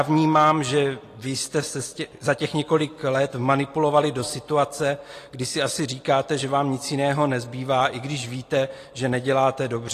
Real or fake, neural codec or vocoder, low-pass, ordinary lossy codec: real; none; 14.4 kHz; AAC, 48 kbps